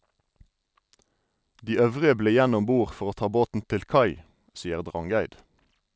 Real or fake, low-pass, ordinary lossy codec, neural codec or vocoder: real; none; none; none